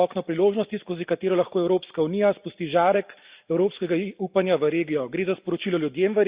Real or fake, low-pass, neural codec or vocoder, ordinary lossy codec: real; 3.6 kHz; none; Opus, 64 kbps